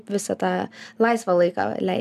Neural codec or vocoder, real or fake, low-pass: none; real; 14.4 kHz